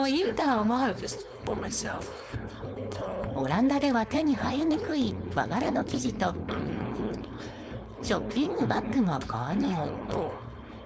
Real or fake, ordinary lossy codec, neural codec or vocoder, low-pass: fake; none; codec, 16 kHz, 4.8 kbps, FACodec; none